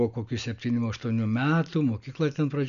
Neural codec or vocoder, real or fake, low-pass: none; real; 7.2 kHz